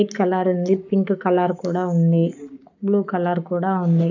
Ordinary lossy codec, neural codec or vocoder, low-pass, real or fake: none; codec, 44.1 kHz, 7.8 kbps, Pupu-Codec; 7.2 kHz; fake